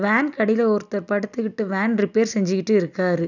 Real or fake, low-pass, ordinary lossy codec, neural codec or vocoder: real; 7.2 kHz; none; none